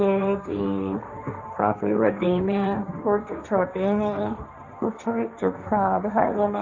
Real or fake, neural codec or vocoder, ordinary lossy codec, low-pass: fake; codec, 16 kHz, 1.1 kbps, Voila-Tokenizer; MP3, 64 kbps; 7.2 kHz